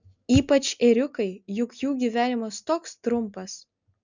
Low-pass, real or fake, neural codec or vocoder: 7.2 kHz; real; none